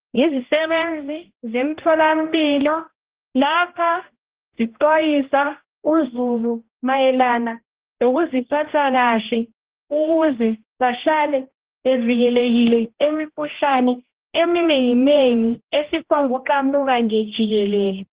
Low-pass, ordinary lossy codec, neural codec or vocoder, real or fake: 3.6 kHz; Opus, 16 kbps; codec, 16 kHz, 1 kbps, X-Codec, HuBERT features, trained on general audio; fake